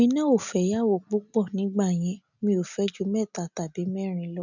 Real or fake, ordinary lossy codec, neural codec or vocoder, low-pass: real; none; none; 7.2 kHz